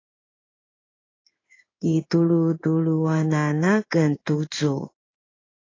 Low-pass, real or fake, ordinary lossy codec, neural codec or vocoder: 7.2 kHz; fake; AAC, 32 kbps; codec, 16 kHz in and 24 kHz out, 1 kbps, XY-Tokenizer